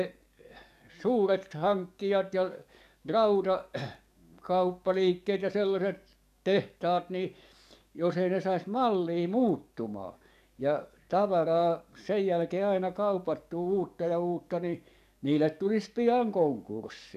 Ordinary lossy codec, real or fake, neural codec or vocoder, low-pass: none; fake; codec, 44.1 kHz, 7.8 kbps, DAC; 14.4 kHz